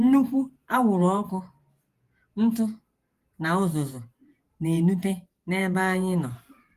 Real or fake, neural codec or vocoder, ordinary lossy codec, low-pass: fake; vocoder, 48 kHz, 128 mel bands, Vocos; Opus, 24 kbps; 14.4 kHz